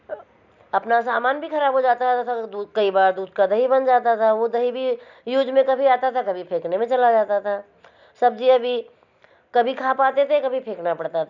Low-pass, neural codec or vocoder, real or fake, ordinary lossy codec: 7.2 kHz; none; real; none